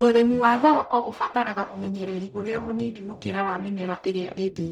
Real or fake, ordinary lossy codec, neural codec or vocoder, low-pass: fake; none; codec, 44.1 kHz, 0.9 kbps, DAC; 19.8 kHz